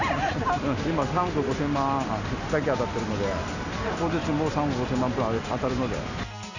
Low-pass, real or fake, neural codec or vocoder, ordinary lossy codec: 7.2 kHz; real; none; none